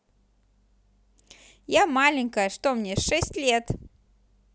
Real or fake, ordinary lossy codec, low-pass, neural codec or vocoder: real; none; none; none